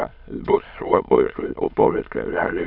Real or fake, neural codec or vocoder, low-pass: fake; autoencoder, 22.05 kHz, a latent of 192 numbers a frame, VITS, trained on many speakers; 5.4 kHz